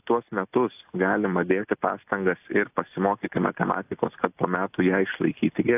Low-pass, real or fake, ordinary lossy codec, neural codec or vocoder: 3.6 kHz; real; AAC, 32 kbps; none